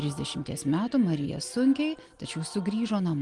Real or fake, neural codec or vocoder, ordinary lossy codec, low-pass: real; none; Opus, 24 kbps; 10.8 kHz